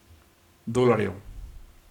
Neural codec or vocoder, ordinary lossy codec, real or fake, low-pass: codec, 44.1 kHz, 7.8 kbps, Pupu-Codec; none; fake; 19.8 kHz